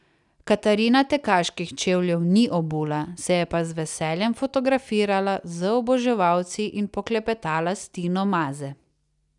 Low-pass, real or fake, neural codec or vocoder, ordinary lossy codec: 10.8 kHz; real; none; none